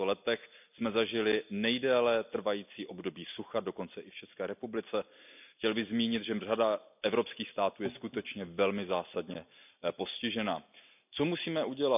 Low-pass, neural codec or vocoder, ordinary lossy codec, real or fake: 3.6 kHz; none; none; real